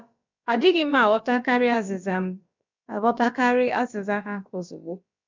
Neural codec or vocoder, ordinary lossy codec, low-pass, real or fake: codec, 16 kHz, about 1 kbps, DyCAST, with the encoder's durations; MP3, 64 kbps; 7.2 kHz; fake